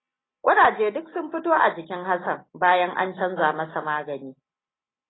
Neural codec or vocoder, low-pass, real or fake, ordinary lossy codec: none; 7.2 kHz; real; AAC, 16 kbps